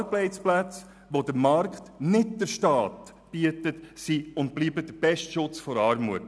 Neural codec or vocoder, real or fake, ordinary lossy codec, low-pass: none; real; none; none